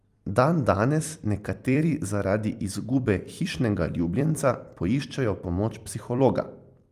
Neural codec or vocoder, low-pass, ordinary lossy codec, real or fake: vocoder, 44.1 kHz, 128 mel bands every 256 samples, BigVGAN v2; 14.4 kHz; Opus, 32 kbps; fake